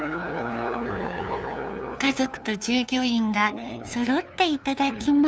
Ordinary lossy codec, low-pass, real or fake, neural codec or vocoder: none; none; fake; codec, 16 kHz, 2 kbps, FunCodec, trained on LibriTTS, 25 frames a second